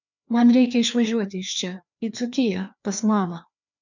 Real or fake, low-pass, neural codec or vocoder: fake; 7.2 kHz; codec, 16 kHz, 2 kbps, FreqCodec, larger model